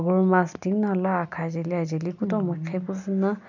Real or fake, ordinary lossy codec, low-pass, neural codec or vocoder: real; none; 7.2 kHz; none